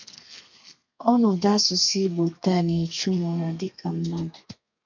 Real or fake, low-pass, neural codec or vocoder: fake; 7.2 kHz; codec, 32 kHz, 1.9 kbps, SNAC